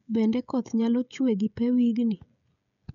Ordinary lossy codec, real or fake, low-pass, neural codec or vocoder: none; fake; 7.2 kHz; codec, 16 kHz, 16 kbps, FreqCodec, smaller model